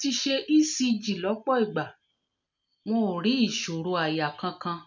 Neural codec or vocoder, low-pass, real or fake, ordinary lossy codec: none; 7.2 kHz; real; MP3, 48 kbps